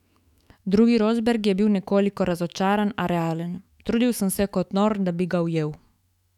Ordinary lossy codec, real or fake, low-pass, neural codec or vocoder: none; fake; 19.8 kHz; autoencoder, 48 kHz, 128 numbers a frame, DAC-VAE, trained on Japanese speech